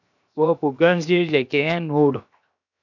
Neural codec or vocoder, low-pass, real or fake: codec, 16 kHz, 0.7 kbps, FocalCodec; 7.2 kHz; fake